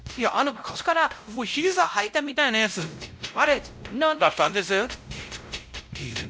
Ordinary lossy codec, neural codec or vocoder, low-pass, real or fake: none; codec, 16 kHz, 0.5 kbps, X-Codec, WavLM features, trained on Multilingual LibriSpeech; none; fake